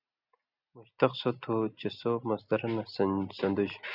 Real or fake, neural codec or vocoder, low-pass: real; none; 5.4 kHz